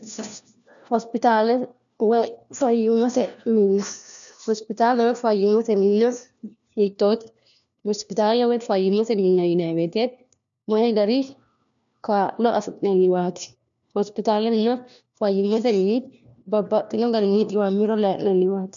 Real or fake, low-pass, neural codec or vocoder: fake; 7.2 kHz; codec, 16 kHz, 1 kbps, FunCodec, trained on LibriTTS, 50 frames a second